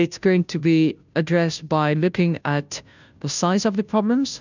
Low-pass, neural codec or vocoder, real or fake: 7.2 kHz; codec, 16 kHz, 0.5 kbps, FunCodec, trained on Chinese and English, 25 frames a second; fake